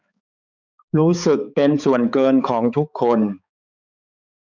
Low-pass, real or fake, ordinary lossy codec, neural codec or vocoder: 7.2 kHz; fake; none; codec, 16 kHz, 4 kbps, X-Codec, HuBERT features, trained on balanced general audio